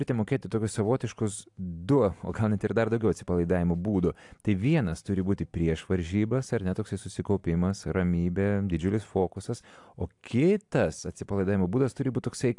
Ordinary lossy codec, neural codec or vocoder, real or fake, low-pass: AAC, 64 kbps; none; real; 10.8 kHz